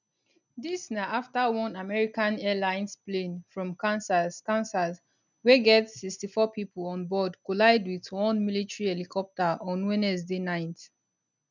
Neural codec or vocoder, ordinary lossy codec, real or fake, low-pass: none; none; real; 7.2 kHz